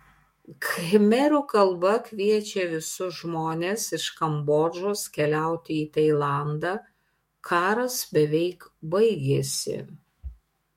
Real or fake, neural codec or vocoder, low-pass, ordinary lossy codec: fake; autoencoder, 48 kHz, 128 numbers a frame, DAC-VAE, trained on Japanese speech; 19.8 kHz; MP3, 64 kbps